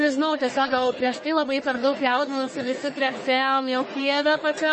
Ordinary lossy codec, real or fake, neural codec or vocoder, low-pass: MP3, 32 kbps; fake; codec, 44.1 kHz, 1.7 kbps, Pupu-Codec; 10.8 kHz